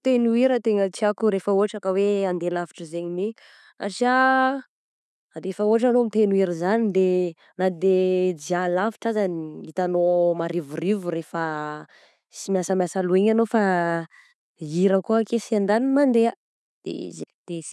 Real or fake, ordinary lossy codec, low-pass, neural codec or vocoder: real; none; none; none